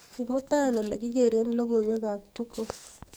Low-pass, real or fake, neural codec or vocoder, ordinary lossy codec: none; fake; codec, 44.1 kHz, 2.6 kbps, SNAC; none